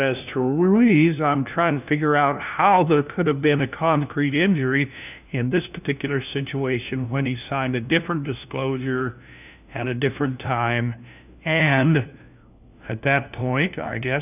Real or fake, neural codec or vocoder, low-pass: fake; codec, 16 kHz, 1 kbps, FunCodec, trained on LibriTTS, 50 frames a second; 3.6 kHz